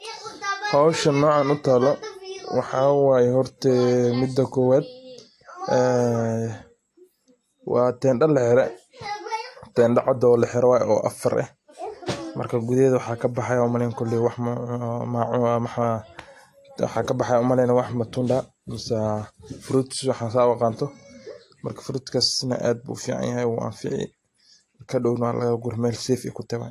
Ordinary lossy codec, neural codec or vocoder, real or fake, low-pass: AAC, 48 kbps; none; real; 14.4 kHz